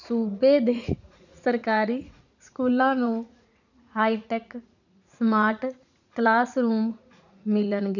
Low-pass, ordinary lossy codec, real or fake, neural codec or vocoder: 7.2 kHz; none; fake; vocoder, 44.1 kHz, 80 mel bands, Vocos